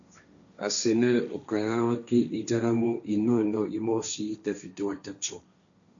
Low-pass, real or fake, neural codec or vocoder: 7.2 kHz; fake; codec, 16 kHz, 1.1 kbps, Voila-Tokenizer